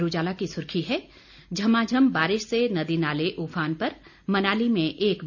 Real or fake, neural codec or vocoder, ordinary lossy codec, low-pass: real; none; none; 7.2 kHz